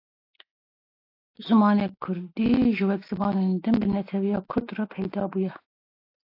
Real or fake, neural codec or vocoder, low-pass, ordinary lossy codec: real; none; 5.4 kHz; AAC, 32 kbps